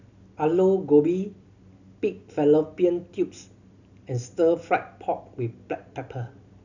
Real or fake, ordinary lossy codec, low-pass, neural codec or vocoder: real; none; 7.2 kHz; none